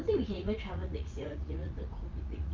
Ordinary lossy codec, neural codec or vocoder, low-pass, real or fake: Opus, 32 kbps; vocoder, 44.1 kHz, 80 mel bands, Vocos; 7.2 kHz; fake